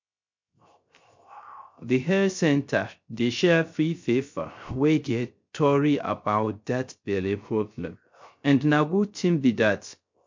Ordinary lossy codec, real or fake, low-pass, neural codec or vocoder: MP3, 48 kbps; fake; 7.2 kHz; codec, 16 kHz, 0.3 kbps, FocalCodec